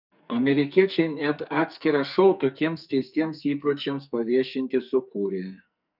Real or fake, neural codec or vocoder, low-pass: fake; codec, 44.1 kHz, 2.6 kbps, SNAC; 5.4 kHz